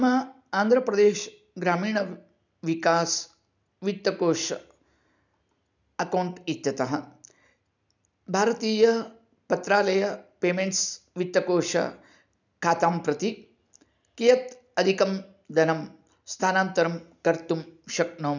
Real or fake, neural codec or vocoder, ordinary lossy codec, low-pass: real; none; none; 7.2 kHz